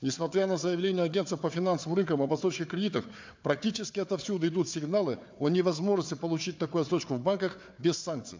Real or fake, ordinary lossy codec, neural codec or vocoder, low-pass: fake; MP3, 64 kbps; codec, 16 kHz, 4 kbps, FunCodec, trained on Chinese and English, 50 frames a second; 7.2 kHz